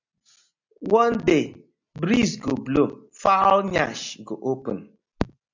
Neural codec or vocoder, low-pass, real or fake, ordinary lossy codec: none; 7.2 kHz; real; MP3, 64 kbps